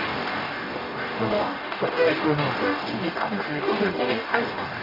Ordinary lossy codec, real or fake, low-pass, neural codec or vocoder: AAC, 48 kbps; fake; 5.4 kHz; codec, 44.1 kHz, 0.9 kbps, DAC